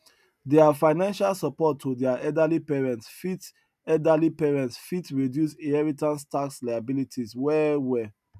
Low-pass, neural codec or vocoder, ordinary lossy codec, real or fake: 14.4 kHz; none; none; real